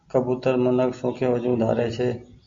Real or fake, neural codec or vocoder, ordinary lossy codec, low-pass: real; none; MP3, 48 kbps; 7.2 kHz